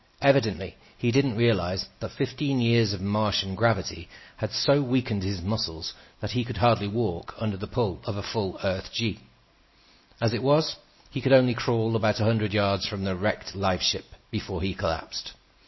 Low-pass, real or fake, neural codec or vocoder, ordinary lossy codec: 7.2 kHz; real; none; MP3, 24 kbps